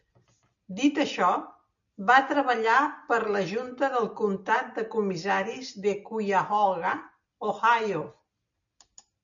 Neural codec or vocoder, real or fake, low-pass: none; real; 7.2 kHz